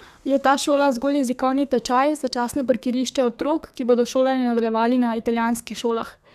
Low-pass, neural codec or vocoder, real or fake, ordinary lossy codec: 14.4 kHz; codec, 32 kHz, 1.9 kbps, SNAC; fake; none